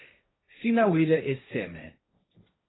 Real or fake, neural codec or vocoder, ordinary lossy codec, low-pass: fake; codec, 16 kHz, 1.1 kbps, Voila-Tokenizer; AAC, 16 kbps; 7.2 kHz